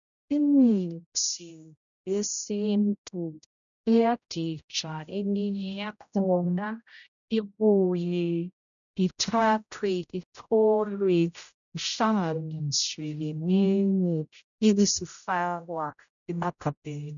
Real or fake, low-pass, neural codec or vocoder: fake; 7.2 kHz; codec, 16 kHz, 0.5 kbps, X-Codec, HuBERT features, trained on general audio